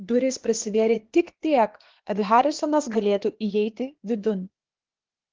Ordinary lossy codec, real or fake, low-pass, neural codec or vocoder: Opus, 16 kbps; fake; 7.2 kHz; codec, 16 kHz, 0.8 kbps, ZipCodec